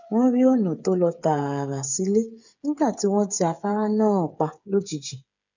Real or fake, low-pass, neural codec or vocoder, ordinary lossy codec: fake; 7.2 kHz; codec, 16 kHz, 8 kbps, FreqCodec, smaller model; none